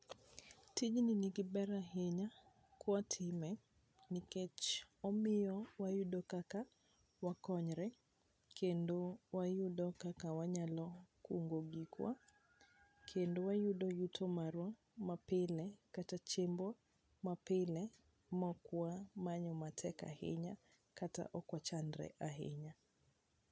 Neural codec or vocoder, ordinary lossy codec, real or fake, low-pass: none; none; real; none